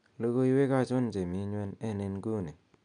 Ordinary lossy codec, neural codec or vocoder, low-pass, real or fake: none; none; 9.9 kHz; real